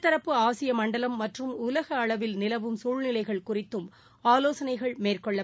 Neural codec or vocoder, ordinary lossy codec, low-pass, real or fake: none; none; none; real